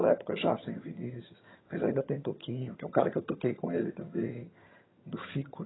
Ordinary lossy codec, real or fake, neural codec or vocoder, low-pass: AAC, 16 kbps; fake; vocoder, 22.05 kHz, 80 mel bands, HiFi-GAN; 7.2 kHz